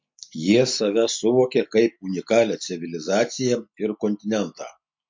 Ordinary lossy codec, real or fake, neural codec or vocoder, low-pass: MP3, 48 kbps; real; none; 7.2 kHz